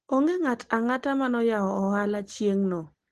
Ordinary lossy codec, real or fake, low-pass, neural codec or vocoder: Opus, 16 kbps; real; 10.8 kHz; none